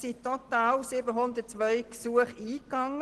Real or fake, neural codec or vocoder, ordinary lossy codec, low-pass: real; none; Opus, 16 kbps; 10.8 kHz